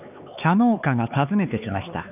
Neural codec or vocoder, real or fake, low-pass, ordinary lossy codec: codec, 16 kHz, 4 kbps, X-Codec, HuBERT features, trained on LibriSpeech; fake; 3.6 kHz; none